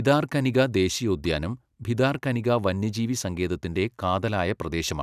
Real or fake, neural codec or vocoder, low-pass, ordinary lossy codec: real; none; 14.4 kHz; none